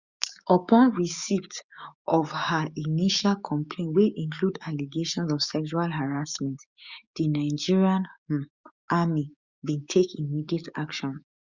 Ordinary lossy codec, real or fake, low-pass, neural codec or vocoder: Opus, 64 kbps; fake; 7.2 kHz; codec, 16 kHz, 6 kbps, DAC